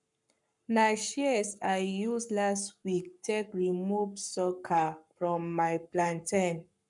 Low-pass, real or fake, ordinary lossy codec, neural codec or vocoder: 10.8 kHz; fake; none; codec, 44.1 kHz, 7.8 kbps, Pupu-Codec